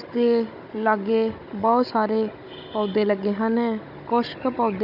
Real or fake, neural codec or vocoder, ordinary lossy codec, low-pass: fake; codec, 16 kHz, 16 kbps, FunCodec, trained on Chinese and English, 50 frames a second; none; 5.4 kHz